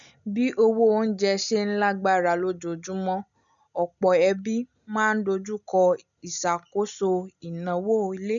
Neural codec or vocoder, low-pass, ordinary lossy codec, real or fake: none; 7.2 kHz; none; real